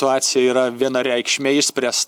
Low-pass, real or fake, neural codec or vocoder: 19.8 kHz; real; none